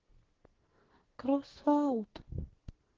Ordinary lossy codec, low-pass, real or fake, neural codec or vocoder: Opus, 16 kbps; 7.2 kHz; fake; codec, 44.1 kHz, 2.6 kbps, SNAC